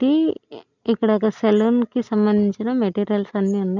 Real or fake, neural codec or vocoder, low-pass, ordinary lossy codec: real; none; 7.2 kHz; none